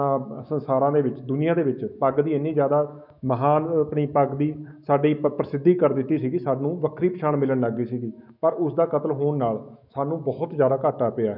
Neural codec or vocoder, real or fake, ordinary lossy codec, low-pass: autoencoder, 48 kHz, 128 numbers a frame, DAC-VAE, trained on Japanese speech; fake; none; 5.4 kHz